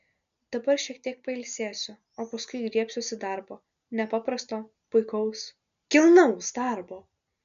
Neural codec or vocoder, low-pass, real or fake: none; 7.2 kHz; real